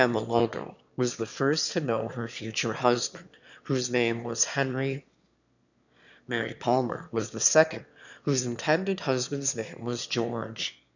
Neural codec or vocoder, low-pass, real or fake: autoencoder, 22.05 kHz, a latent of 192 numbers a frame, VITS, trained on one speaker; 7.2 kHz; fake